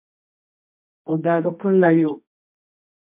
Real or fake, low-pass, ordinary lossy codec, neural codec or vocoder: fake; 3.6 kHz; MP3, 32 kbps; codec, 24 kHz, 0.9 kbps, WavTokenizer, medium music audio release